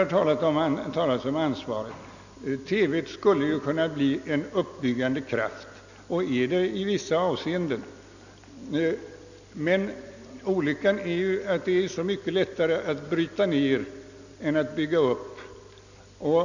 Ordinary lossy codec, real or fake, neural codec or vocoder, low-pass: none; real; none; 7.2 kHz